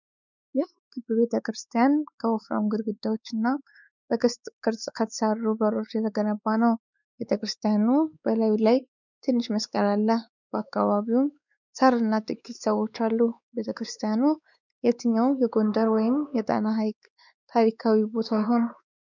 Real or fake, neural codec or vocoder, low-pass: fake; codec, 16 kHz, 4 kbps, X-Codec, WavLM features, trained on Multilingual LibriSpeech; 7.2 kHz